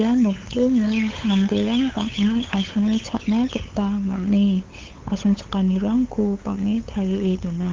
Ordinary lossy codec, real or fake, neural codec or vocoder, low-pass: Opus, 16 kbps; fake; codec, 16 kHz, 8 kbps, FunCodec, trained on LibriTTS, 25 frames a second; 7.2 kHz